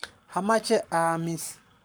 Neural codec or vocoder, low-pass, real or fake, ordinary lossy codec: codec, 44.1 kHz, 7.8 kbps, Pupu-Codec; none; fake; none